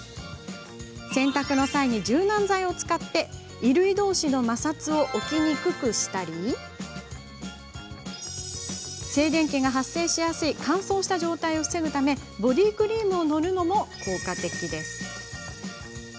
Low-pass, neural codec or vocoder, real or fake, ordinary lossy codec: none; none; real; none